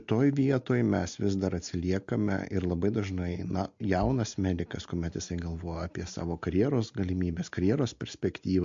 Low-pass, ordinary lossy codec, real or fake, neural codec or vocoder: 7.2 kHz; MP3, 48 kbps; real; none